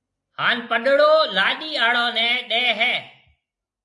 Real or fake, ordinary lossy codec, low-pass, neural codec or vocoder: real; AAC, 64 kbps; 10.8 kHz; none